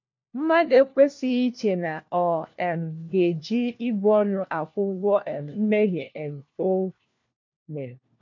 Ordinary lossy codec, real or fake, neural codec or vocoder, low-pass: MP3, 48 kbps; fake; codec, 16 kHz, 1 kbps, FunCodec, trained on LibriTTS, 50 frames a second; 7.2 kHz